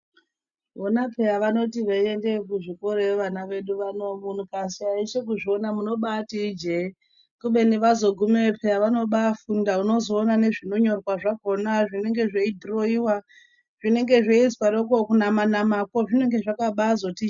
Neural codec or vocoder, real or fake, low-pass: none; real; 7.2 kHz